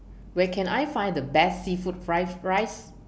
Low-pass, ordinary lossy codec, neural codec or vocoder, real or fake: none; none; none; real